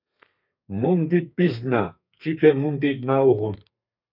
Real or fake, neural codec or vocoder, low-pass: fake; codec, 32 kHz, 1.9 kbps, SNAC; 5.4 kHz